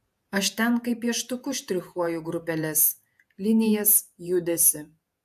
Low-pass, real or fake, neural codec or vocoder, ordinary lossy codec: 14.4 kHz; fake; vocoder, 48 kHz, 128 mel bands, Vocos; AAC, 96 kbps